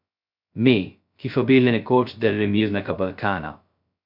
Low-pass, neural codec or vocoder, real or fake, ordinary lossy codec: 5.4 kHz; codec, 16 kHz, 0.2 kbps, FocalCodec; fake; MP3, 48 kbps